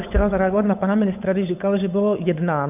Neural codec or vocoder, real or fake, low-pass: codec, 16 kHz, 8 kbps, FunCodec, trained on Chinese and English, 25 frames a second; fake; 3.6 kHz